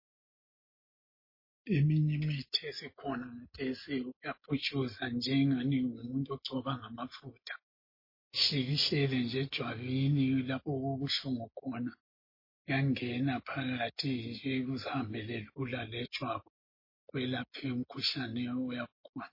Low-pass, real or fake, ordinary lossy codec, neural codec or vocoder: 5.4 kHz; real; MP3, 24 kbps; none